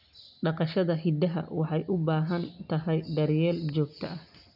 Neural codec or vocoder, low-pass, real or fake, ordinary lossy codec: none; 5.4 kHz; real; none